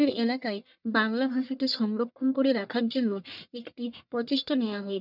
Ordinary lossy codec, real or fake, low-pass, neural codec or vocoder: none; fake; 5.4 kHz; codec, 44.1 kHz, 1.7 kbps, Pupu-Codec